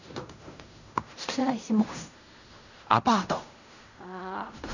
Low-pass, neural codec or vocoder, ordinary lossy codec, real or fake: 7.2 kHz; codec, 16 kHz in and 24 kHz out, 0.4 kbps, LongCat-Audio-Codec, fine tuned four codebook decoder; none; fake